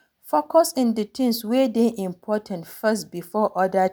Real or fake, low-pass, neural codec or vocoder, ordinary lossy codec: real; none; none; none